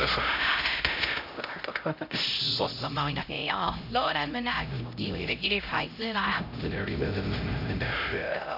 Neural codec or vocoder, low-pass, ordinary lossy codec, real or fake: codec, 16 kHz, 0.5 kbps, X-Codec, HuBERT features, trained on LibriSpeech; 5.4 kHz; none; fake